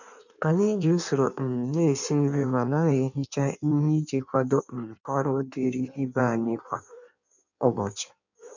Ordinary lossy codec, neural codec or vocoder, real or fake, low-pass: none; codec, 16 kHz in and 24 kHz out, 1.1 kbps, FireRedTTS-2 codec; fake; 7.2 kHz